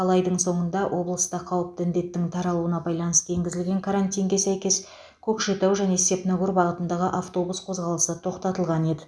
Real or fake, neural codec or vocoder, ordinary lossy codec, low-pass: real; none; none; none